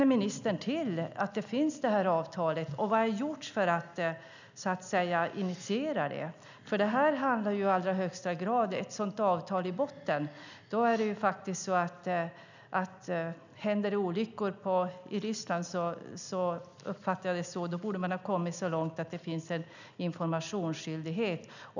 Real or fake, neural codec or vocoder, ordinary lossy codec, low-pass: real; none; none; 7.2 kHz